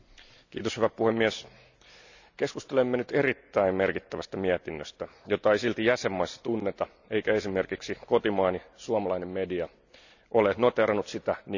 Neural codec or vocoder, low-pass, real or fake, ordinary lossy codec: none; 7.2 kHz; real; none